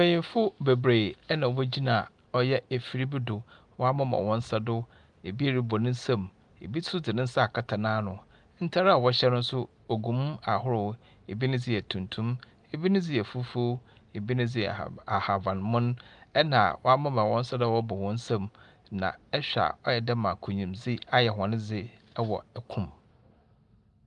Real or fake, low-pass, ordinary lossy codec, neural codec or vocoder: real; 10.8 kHz; Opus, 32 kbps; none